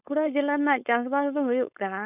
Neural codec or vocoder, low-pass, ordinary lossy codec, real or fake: codec, 16 kHz, 4.8 kbps, FACodec; 3.6 kHz; none; fake